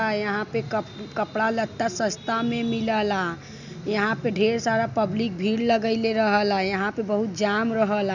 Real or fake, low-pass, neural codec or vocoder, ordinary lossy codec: real; 7.2 kHz; none; none